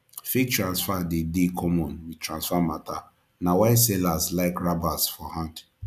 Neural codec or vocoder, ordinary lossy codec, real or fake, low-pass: none; none; real; 14.4 kHz